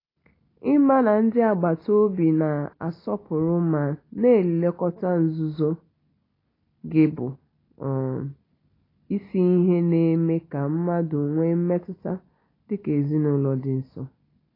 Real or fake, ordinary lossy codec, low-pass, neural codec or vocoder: real; AAC, 24 kbps; 5.4 kHz; none